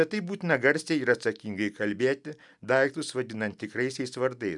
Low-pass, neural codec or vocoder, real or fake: 10.8 kHz; none; real